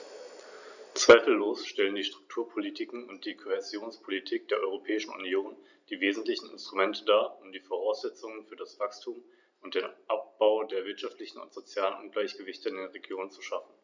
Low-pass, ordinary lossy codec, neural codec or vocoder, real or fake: 7.2 kHz; none; none; real